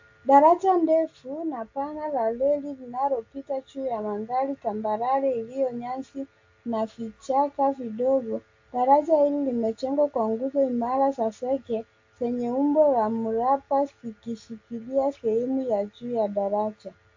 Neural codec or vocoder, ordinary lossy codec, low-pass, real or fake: none; AAC, 48 kbps; 7.2 kHz; real